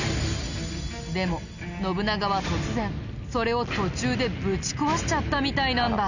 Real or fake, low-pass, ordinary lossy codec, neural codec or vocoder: real; 7.2 kHz; none; none